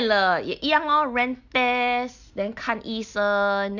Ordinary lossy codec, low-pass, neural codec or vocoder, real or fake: none; 7.2 kHz; none; real